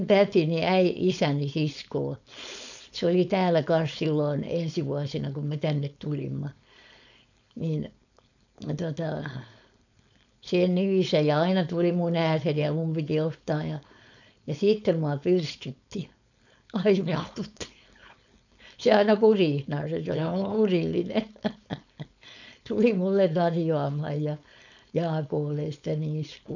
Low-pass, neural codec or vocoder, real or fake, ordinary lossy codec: 7.2 kHz; codec, 16 kHz, 4.8 kbps, FACodec; fake; none